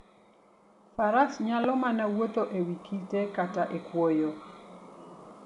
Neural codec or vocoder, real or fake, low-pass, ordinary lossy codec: none; real; 10.8 kHz; none